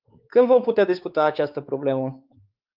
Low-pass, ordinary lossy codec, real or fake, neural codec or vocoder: 5.4 kHz; Opus, 24 kbps; fake; codec, 16 kHz, 4 kbps, X-Codec, WavLM features, trained on Multilingual LibriSpeech